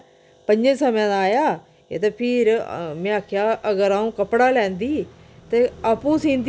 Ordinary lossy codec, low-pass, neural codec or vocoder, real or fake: none; none; none; real